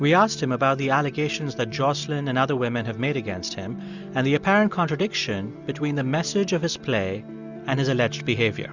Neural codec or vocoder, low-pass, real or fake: none; 7.2 kHz; real